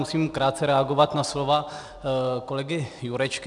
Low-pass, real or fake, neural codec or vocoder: 10.8 kHz; real; none